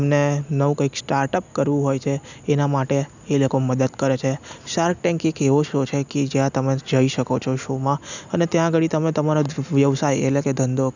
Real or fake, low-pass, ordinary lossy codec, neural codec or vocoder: real; 7.2 kHz; none; none